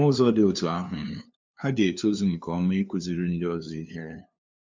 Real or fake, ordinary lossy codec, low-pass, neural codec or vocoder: fake; MP3, 64 kbps; 7.2 kHz; codec, 16 kHz, 2 kbps, FunCodec, trained on LibriTTS, 25 frames a second